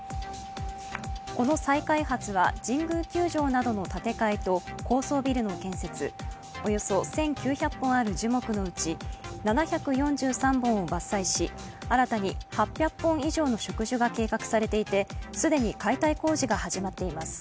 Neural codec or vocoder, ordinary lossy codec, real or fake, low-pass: none; none; real; none